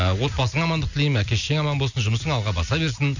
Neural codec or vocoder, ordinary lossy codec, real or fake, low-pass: none; none; real; 7.2 kHz